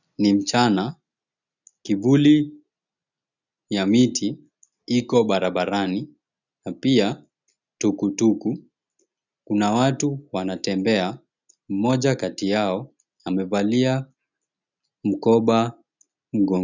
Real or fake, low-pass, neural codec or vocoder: real; 7.2 kHz; none